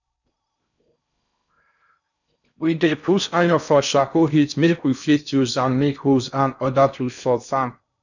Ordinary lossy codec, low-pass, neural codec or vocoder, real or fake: none; 7.2 kHz; codec, 16 kHz in and 24 kHz out, 0.6 kbps, FocalCodec, streaming, 4096 codes; fake